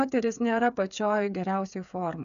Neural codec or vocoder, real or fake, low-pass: codec, 16 kHz, 8 kbps, FreqCodec, smaller model; fake; 7.2 kHz